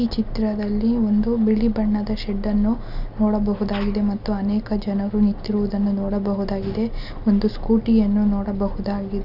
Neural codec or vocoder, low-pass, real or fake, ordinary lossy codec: none; 5.4 kHz; real; none